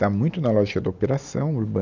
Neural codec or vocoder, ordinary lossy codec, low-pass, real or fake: none; none; 7.2 kHz; real